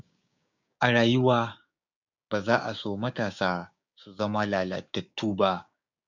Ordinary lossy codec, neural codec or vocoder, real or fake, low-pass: none; codec, 16 kHz, 6 kbps, DAC; fake; 7.2 kHz